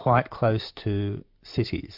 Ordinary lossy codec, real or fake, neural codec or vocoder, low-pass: MP3, 48 kbps; real; none; 5.4 kHz